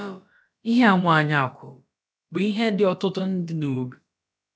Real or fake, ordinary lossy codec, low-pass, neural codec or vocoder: fake; none; none; codec, 16 kHz, about 1 kbps, DyCAST, with the encoder's durations